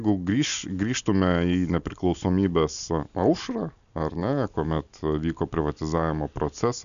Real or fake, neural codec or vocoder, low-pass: real; none; 7.2 kHz